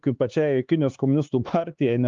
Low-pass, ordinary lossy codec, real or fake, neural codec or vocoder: 7.2 kHz; Opus, 32 kbps; fake; codec, 16 kHz, 4 kbps, X-Codec, HuBERT features, trained on LibriSpeech